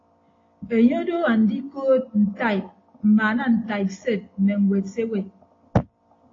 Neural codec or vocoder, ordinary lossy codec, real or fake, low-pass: none; AAC, 32 kbps; real; 7.2 kHz